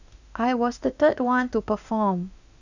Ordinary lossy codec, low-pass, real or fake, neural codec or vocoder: none; 7.2 kHz; fake; autoencoder, 48 kHz, 32 numbers a frame, DAC-VAE, trained on Japanese speech